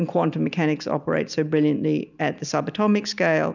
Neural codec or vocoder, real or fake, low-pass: none; real; 7.2 kHz